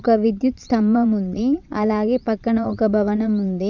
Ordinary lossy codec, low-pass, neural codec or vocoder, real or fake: none; 7.2 kHz; vocoder, 22.05 kHz, 80 mel bands, Vocos; fake